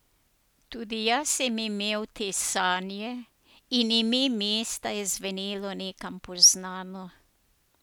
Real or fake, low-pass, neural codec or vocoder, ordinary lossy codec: real; none; none; none